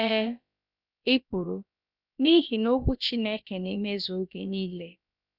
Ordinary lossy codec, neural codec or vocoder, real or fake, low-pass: none; codec, 16 kHz, about 1 kbps, DyCAST, with the encoder's durations; fake; 5.4 kHz